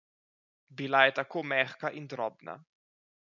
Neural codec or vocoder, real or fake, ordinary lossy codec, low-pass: none; real; none; 7.2 kHz